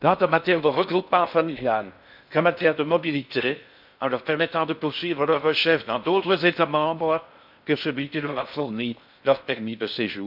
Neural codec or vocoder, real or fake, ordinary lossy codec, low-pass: codec, 16 kHz in and 24 kHz out, 0.6 kbps, FocalCodec, streaming, 4096 codes; fake; none; 5.4 kHz